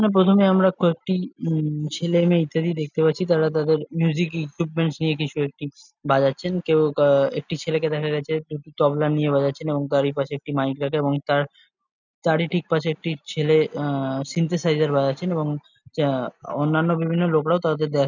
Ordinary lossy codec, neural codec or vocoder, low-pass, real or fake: none; none; 7.2 kHz; real